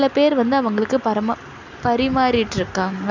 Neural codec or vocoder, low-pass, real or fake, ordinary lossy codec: none; 7.2 kHz; real; none